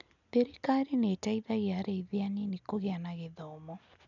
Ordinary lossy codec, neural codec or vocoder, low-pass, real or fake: none; none; 7.2 kHz; real